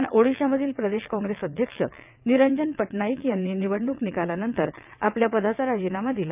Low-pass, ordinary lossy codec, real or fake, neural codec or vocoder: 3.6 kHz; none; fake; vocoder, 22.05 kHz, 80 mel bands, WaveNeXt